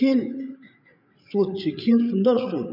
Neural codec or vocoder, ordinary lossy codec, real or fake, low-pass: codec, 16 kHz, 8 kbps, FreqCodec, larger model; none; fake; 5.4 kHz